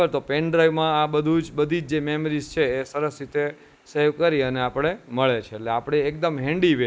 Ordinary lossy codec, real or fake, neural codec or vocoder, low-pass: none; real; none; none